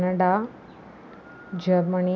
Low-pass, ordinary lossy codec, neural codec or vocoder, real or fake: none; none; none; real